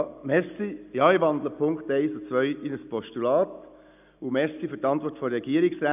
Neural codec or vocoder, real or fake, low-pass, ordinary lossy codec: none; real; 3.6 kHz; none